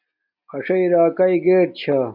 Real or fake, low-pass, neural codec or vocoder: real; 5.4 kHz; none